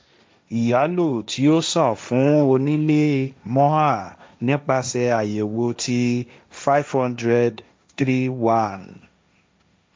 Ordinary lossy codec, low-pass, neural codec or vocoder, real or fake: none; none; codec, 16 kHz, 1.1 kbps, Voila-Tokenizer; fake